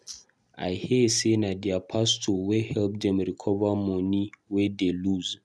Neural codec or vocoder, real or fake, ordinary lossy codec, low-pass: none; real; none; none